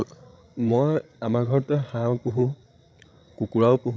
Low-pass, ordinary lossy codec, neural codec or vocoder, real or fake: none; none; codec, 16 kHz, 16 kbps, FreqCodec, larger model; fake